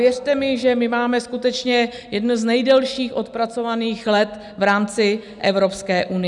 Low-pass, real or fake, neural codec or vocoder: 10.8 kHz; real; none